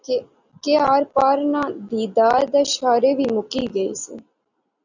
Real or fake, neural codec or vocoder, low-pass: real; none; 7.2 kHz